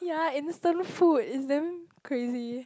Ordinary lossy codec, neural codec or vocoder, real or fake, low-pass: none; none; real; none